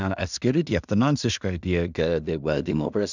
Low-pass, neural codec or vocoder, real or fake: 7.2 kHz; codec, 16 kHz in and 24 kHz out, 0.4 kbps, LongCat-Audio-Codec, two codebook decoder; fake